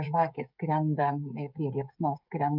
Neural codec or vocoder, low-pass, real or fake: codec, 16 kHz, 16 kbps, FreqCodec, smaller model; 5.4 kHz; fake